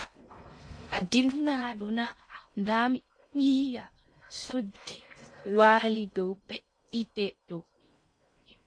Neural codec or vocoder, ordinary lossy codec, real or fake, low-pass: codec, 16 kHz in and 24 kHz out, 0.6 kbps, FocalCodec, streaming, 2048 codes; MP3, 48 kbps; fake; 9.9 kHz